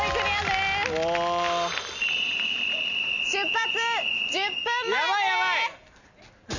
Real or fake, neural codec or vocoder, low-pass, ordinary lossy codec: real; none; 7.2 kHz; none